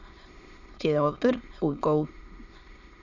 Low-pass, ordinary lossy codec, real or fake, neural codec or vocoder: 7.2 kHz; Opus, 64 kbps; fake; autoencoder, 22.05 kHz, a latent of 192 numbers a frame, VITS, trained on many speakers